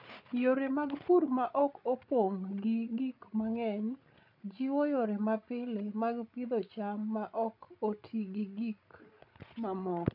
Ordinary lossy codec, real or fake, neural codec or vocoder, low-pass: none; fake; codec, 16 kHz, 16 kbps, FreqCodec, larger model; 5.4 kHz